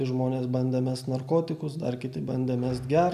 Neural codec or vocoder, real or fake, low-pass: none; real; 14.4 kHz